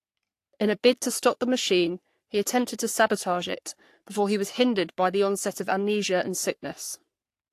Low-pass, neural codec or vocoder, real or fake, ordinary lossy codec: 14.4 kHz; codec, 44.1 kHz, 3.4 kbps, Pupu-Codec; fake; AAC, 64 kbps